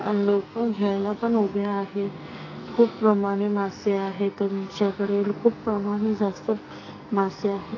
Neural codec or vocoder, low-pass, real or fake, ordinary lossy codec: codec, 44.1 kHz, 2.6 kbps, SNAC; 7.2 kHz; fake; AAC, 32 kbps